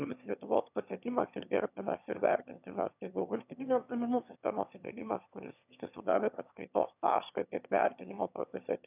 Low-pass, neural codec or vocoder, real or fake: 3.6 kHz; autoencoder, 22.05 kHz, a latent of 192 numbers a frame, VITS, trained on one speaker; fake